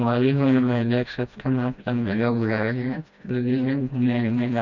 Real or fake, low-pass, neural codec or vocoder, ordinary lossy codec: fake; 7.2 kHz; codec, 16 kHz, 1 kbps, FreqCodec, smaller model; none